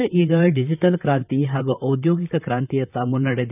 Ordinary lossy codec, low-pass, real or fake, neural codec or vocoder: none; 3.6 kHz; fake; vocoder, 44.1 kHz, 128 mel bands, Pupu-Vocoder